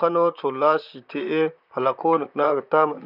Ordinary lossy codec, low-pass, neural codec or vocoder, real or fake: none; 5.4 kHz; vocoder, 44.1 kHz, 128 mel bands, Pupu-Vocoder; fake